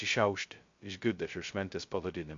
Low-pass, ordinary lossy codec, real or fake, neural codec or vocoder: 7.2 kHz; MP3, 48 kbps; fake; codec, 16 kHz, 0.2 kbps, FocalCodec